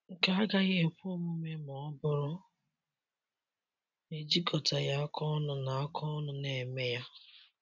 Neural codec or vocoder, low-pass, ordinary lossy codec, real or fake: none; 7.2 kHz; none; real